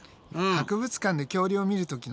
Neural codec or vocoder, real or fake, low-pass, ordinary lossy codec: none; real; none; none